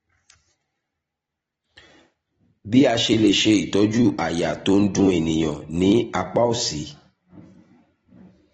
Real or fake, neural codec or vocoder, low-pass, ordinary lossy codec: fake; vocoder, 44.1 kHz, 128 mel bands every 256 samples, BigVGAN v2; 19.8 kHz; AAC, 24 kbps